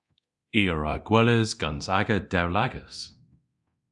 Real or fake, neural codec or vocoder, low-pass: fake; codec, 24 kHz, 0.9 kbps, DualCodec; 10.8 kHz